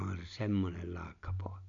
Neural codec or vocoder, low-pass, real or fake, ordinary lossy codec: none; 7.2 kHz; real; none